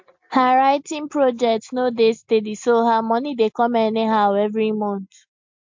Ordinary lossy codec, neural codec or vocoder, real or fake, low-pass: MP3, 48 kbps; none; real; 7.2 kHz